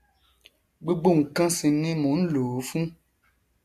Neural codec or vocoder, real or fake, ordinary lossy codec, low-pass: none; real; AAC, 64 kbps; 14.4 kHz